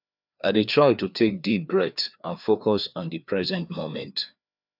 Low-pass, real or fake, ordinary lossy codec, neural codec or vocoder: 5.4 kHz; fake; none; codec, 16 kHz, 2 kbps, FreqCodec, larger model